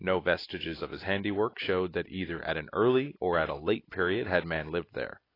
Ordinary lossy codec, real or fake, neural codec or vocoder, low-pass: AAC, 24 kbps; real; none; 5.4 kHz